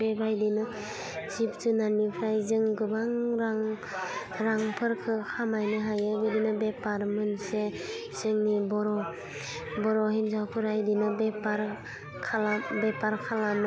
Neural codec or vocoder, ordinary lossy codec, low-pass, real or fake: none; none; none; real